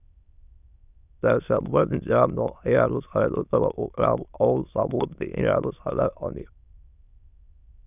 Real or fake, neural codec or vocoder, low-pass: fake; autoencoder, 22.05 kHz, a latent of 192 numbers a frame, VITS, trained on many speakers; 3.6 kHz